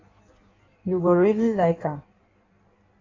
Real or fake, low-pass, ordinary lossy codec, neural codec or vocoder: fake; 7.2 kHz; AAC, 32 kbps; codec, 16 kHz in and 24 kHz out, 1.1 kbps, FireRedTTS-2 codec